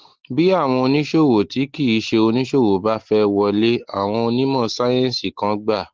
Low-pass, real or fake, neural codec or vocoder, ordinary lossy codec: 7.2 kHz; real; none; Opus, 16 kbps